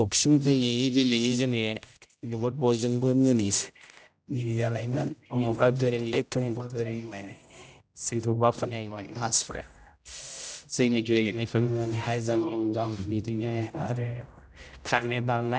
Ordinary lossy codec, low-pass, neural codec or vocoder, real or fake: none; none; codec, 16 kHz, 0.5 kbps, X-Codec, HuBERT features, trained on general audio; fake